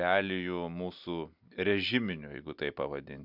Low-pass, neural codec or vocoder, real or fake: 5.4 kHz; none; real